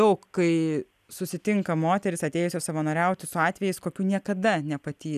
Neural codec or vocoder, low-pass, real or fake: none; 14.4 kHz; real